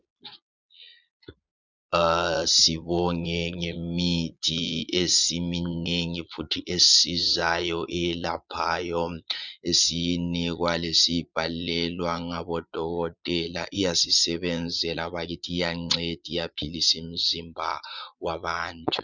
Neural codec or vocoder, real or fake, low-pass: vocoder, 22.05 kHz, 80 mel bands, Vocos; fake; 7.2 kHz